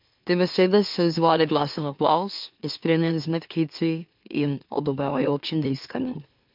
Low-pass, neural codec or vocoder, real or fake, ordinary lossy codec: 5.4 kHz; autoencoder, 44.1 kHz, a latent of 192 numbers a frame, MeloTTS; fake; MP3, 48 kbps